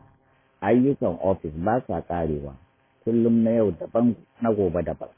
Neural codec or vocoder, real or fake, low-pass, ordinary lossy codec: vocoder, 44.1 kHz, 128 mel bands every 512 samples, BigVGAN v2; fake; 3.6 kHz; MP3, 16 kbps